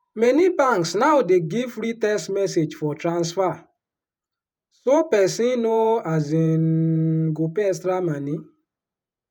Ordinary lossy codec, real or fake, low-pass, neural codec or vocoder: none; fake; none; vocoder, 48 kHz, 128 mel bands, Vocos